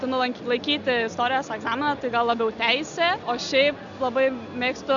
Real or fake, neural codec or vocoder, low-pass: real; none; 7.2 kHz